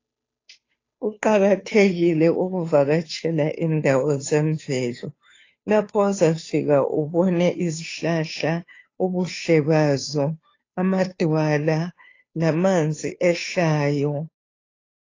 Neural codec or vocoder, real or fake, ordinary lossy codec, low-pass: codec, 16 kHz, 2 kbps, FunCodec, trained on Chinese and English, 25 frames a second; fake; AAC, 32 kbps; 7.2 kHz